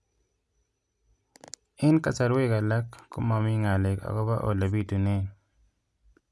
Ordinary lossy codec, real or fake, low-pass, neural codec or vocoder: none; real; none; none